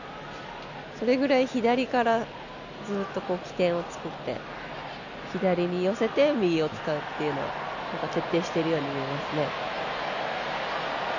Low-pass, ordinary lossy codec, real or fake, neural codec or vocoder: 7.2 kHz; none; real; none